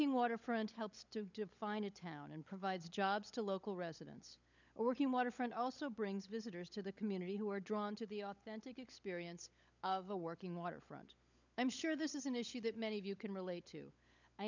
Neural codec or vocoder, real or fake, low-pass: codec, 16 kHz, 16 kbps, FunCodec, trained on LibriTTS, 50 frames a second; fake; 7.2 kHz